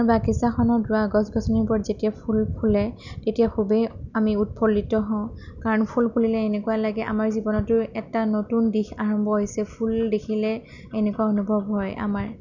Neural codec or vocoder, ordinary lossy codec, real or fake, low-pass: none; none; real; 7.2 kHz